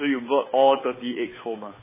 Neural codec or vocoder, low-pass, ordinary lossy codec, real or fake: codec, 16 kHz, 8 kbps, FunCodec, trained on Chinese and English, 25 frames a second; 3.6 kHz; MP3, 16 kbps; fake